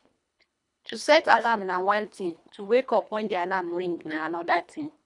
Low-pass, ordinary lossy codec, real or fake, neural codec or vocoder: 10.8 kHz; none; fake; codec, 24 kHz, 1.5 kbps, HILCodec